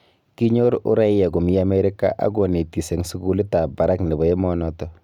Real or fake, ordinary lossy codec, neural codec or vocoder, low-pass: real; none; none; 19.8 kHz